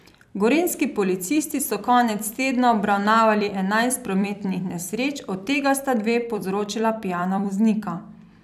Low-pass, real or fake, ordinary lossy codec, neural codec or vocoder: 14.4 kHz; fake; none; vocoder, 44.1 kHz, 128 mel bands every 256 samples, BigVGAN v2